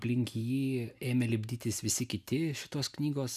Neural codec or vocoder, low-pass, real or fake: none; 14.4 kHz; real